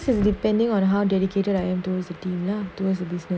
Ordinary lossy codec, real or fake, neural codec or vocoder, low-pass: none; real; none; none